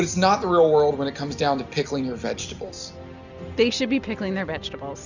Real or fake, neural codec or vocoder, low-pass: real; none; 7.2 kHz